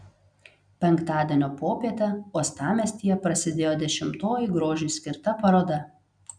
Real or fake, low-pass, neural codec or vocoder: real; 9.9 kHz; none